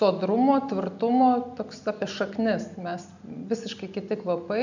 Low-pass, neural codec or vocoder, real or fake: 7.2 kHz; none; real